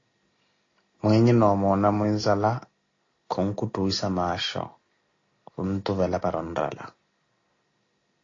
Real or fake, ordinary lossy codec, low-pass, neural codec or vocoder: real; AAC, 32 kbps; 7.2 kHz; none